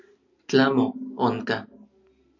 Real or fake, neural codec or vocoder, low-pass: real; none; 7.2 kHz